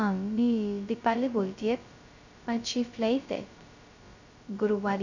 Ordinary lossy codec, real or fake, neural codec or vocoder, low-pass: none; fake; codec, 16 kHz, 0.2 kbps, FocalCodec; 7.2 kHz